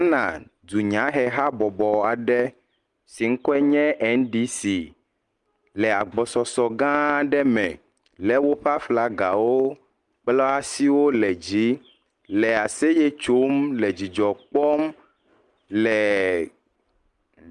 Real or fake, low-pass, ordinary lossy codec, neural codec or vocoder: real; 10.8 kHz; Opus, 32 kbps; none